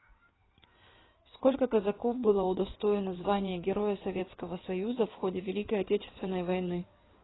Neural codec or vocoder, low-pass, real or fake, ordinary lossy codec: codec, 16 kHz in and 24 kHz out, 2.2 kbps, FireRedTTS-2 codec; 7.2 kHz; fake; AAC, 16 kbps